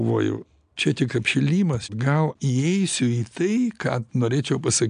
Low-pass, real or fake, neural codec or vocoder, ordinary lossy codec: 9.9 kHz; real; none; MP3, 96 kbps